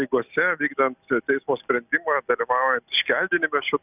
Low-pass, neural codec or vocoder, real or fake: 3.6 kHz; none; real